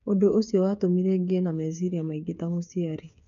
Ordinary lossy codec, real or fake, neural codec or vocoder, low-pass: none; fake; codec, 16 kHz, 8 kbps, FreqCodec, smaller model; 7.2 kHz